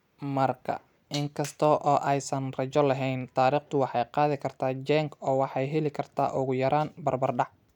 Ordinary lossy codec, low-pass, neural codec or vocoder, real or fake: none; 19.8 kHz; none; real